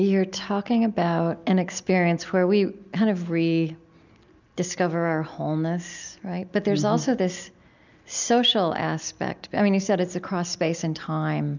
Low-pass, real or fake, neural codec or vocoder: 7.2 kHz; real; none